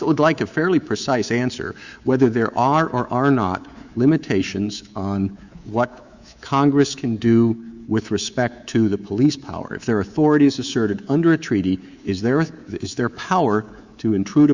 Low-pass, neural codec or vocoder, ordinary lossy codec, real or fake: 7.2 kHz; codec, 24 kHz, 3.1 kbps, DualCodec; Opus, 64 kbps; fake